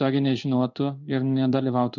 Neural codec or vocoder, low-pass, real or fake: codec, 16 kHz in and 24 kHz out, 1 kbps, XY-Tokenizer; 7.2 kHz; fake